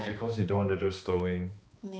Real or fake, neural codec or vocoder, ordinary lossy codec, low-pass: fake; codec, 16 kHz, 2 kbps, X-Codec, HuBERT features, trained on balanced general audio; none; none